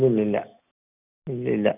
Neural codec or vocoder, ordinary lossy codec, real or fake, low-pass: none; none; real; 3.6 kHz